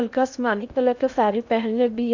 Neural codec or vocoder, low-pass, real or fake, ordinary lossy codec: codec, 16 kHz in and 24 kHz out, 0.8 kbps, FocalCodec, streaming, 65536 codes; 7.2 kHz; fake; none